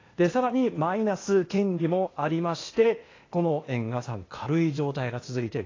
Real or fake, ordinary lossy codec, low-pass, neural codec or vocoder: fake; AAC, 32 kbps; 7.2 kHz; codec, 16 kHz, 0.8 kbps, ZipCodec